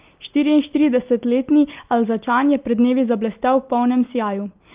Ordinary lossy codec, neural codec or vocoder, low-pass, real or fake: Opus, 24 kbps; none; 3.6 kHz; real